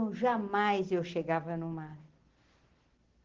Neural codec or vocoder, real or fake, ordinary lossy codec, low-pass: none; real; Opus, 16 kbps; 7.2 kHz